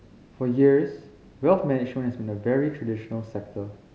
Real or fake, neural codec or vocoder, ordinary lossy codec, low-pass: real; none; none; none